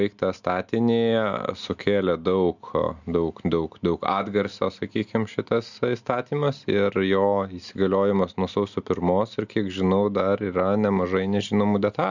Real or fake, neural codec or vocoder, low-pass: real; none; 7.2 kHz